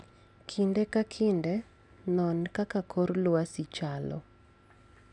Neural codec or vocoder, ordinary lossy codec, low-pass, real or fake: none; none; 10.8 kHz; real